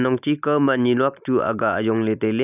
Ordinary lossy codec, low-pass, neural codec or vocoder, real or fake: none; 3.6 kHz; none; real